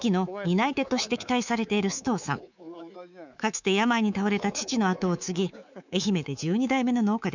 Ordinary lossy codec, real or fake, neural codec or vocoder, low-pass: none; fake; codec, 24 kHz, 3.1 kbps, DualCodec; 7.2 kHz